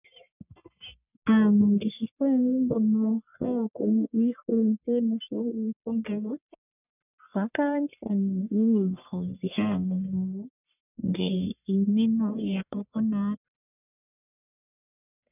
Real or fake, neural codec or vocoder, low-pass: fake; codec, 44.1 kHz, 1.7 kbps, Pupu-Codec; 3.6 kHz